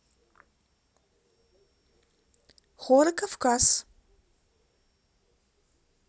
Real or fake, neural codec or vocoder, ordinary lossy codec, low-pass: real; none; none; none